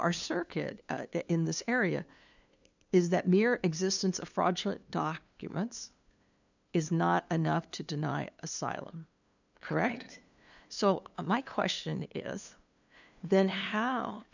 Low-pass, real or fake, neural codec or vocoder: 7.2 kHz; fake; codec, 16 kHz, 2 kbps, FunCodec, trained on LibriTTS, 25 frames a second